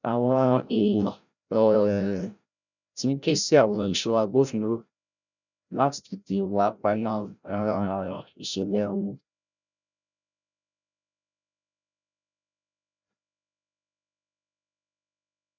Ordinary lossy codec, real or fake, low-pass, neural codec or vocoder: none; fake; 7.2 kHz; codec, 16 kHz, 0.5 kbps, FreqCodec, larger model